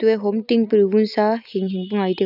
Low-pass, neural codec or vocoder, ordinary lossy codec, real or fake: 5.4 kHz; none; none; real